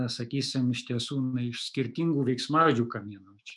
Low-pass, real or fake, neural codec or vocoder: 10.8 kHz; fake; autoencoder, 48 kHz, 128 numbers a frame, DAC-VAE, trained on Japanese speech